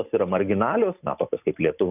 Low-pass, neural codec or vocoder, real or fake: 3.6 kHz; none; real